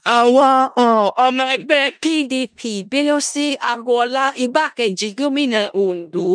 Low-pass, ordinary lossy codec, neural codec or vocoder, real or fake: 9.9 kHz; none; codec, 16 kHz in and 24 kHz out, 0.4 kbps, LongCat-Audio-Codec, four codebook decoder; fake